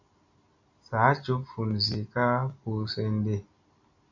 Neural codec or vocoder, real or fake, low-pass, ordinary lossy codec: none; real; 7.2 kHz; AAC, 48 kbps